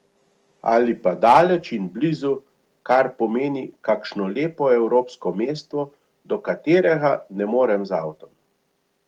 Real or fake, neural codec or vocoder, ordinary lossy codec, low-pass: real; none; Opus, 24 kbps; 19.8 kHz